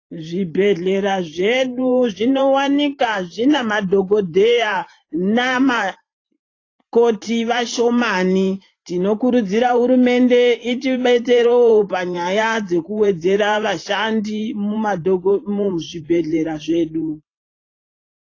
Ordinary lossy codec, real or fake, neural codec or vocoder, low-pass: AAC, 32 kbps; fake; vocoder, 44.1 kHz, 128 mel bands every 512 samples, BigVGAN v2; 7.2 kHz